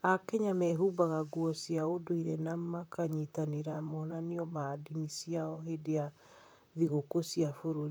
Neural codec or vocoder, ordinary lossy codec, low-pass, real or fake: vocoder, 44.1 kHz, 128 mel bands, Pupu-Vocoder; none; none; fake